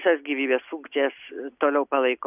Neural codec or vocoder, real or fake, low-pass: none; real; 3.6 kHz